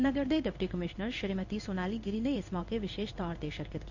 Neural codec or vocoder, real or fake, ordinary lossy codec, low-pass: codec, 16 kHz in and 24 kHz out, 1 kbps, XY-Tokenizer; fake; none; 7.2 kHz